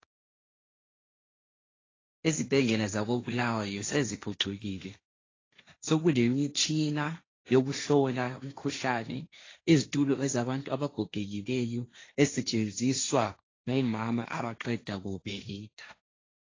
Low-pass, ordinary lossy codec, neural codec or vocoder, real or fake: 7.2 kHz; AAC, 32 kbps; codec, 16 kHz, 1.1 kbps, Voila-Tokenizer; fake